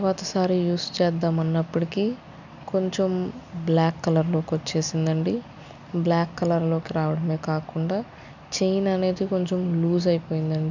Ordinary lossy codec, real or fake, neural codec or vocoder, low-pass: none; real; none; 7.2 kHz